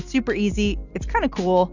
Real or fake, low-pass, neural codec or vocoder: real; 7.2 kHz; none